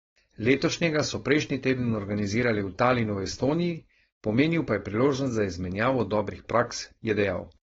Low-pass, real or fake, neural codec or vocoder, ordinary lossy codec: 7.2 kHz; fake; codec, 16 kHz, 4.8 kbps, FACodec; AAC, 24 kbps